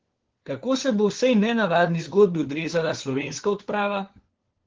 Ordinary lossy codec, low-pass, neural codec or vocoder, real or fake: Opus, 16 kbps; 7.2 kHz; codec, 16 kHz, 4 kbps, FunCodec, trained on LibriTTS, 50 frames a second; fake